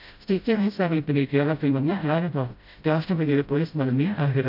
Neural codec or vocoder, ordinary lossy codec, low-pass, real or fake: codec, 16 kHz, 0.5 kbps, FreqCodec, smaller model; none; 5.4 kHz; fake